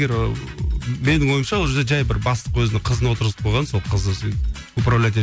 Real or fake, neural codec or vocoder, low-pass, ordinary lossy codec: real; none; none; none